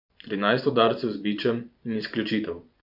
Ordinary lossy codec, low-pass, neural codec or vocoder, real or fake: none; 5.4 kHz; vocoder, 24 kHz, 100 mel bands, Vocos; fake